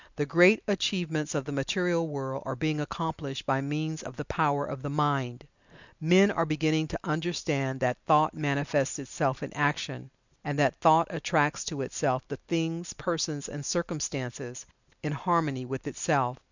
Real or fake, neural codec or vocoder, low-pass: real; none; 7.2 kHz